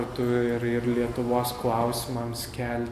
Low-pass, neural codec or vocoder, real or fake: 14.4 kHz; none; real